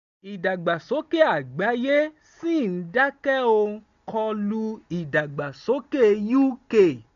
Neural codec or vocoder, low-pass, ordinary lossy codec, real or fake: none; 7.2 kHz; none; real